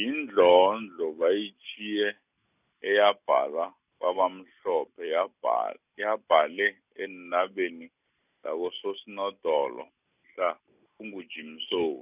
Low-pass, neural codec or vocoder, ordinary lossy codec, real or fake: 3.6 kHz; none; none; real